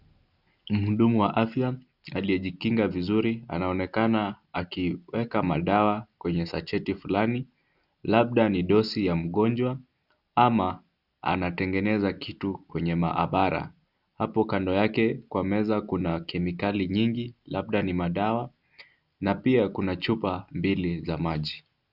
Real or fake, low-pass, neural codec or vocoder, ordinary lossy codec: real; 5.4 kHz; none; Opus, 64 kbps